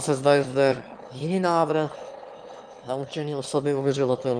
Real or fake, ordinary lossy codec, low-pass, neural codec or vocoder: fake; Opus, 32 kbps; 9.9 kHz; autoencoder, 22.05 kHz, a latent of 192 numbers a frame, VITS, trained on one speaker